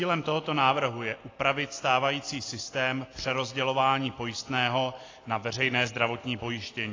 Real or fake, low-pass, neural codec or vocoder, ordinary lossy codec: real; 7.2 kHz; none; AAC, 32 kbps